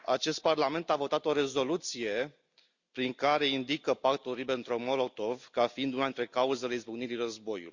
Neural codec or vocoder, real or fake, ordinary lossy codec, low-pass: none; real; Opus, 64 kbps; 7.2 kHz